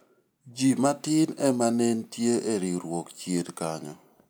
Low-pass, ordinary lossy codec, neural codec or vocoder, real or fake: none; none; none; real